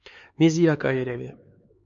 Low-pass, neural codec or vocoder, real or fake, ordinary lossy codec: 7.2 kHz; codec, 16 kHz, 4 kbps, X-Codec, HuBERT features, trained on LibriSpeech; fake; MP3, 48 kbps